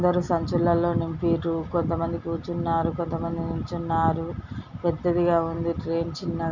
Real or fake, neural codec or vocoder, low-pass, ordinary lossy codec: real; none; 7.2 kHz; none